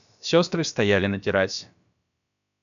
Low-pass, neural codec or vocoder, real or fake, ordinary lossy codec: 7.2 kHz; codec, 16 kHz, about 1 kbps, DyCAST, with the encoder's durations; fake; MP3, 96 kbps